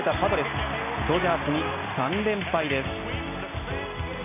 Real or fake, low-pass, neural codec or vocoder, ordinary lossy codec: real; 3.6 kHz; none; MP3, 32 kbps